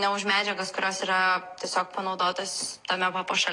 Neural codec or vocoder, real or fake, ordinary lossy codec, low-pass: none; real; AAC, 32 kbps; 10.8 kHz